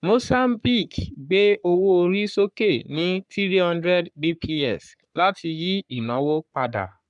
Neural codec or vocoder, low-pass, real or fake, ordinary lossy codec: codec, 44.1 kHz, 3.4 kbps, Pupu-Codec; 10.8 kHz; fake; none